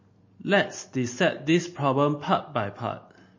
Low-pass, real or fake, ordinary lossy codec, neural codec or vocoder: 7.2 kHz; real; MP3, 32 kbps; none